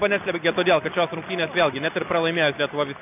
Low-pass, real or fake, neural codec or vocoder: 3.6 kHz; real; none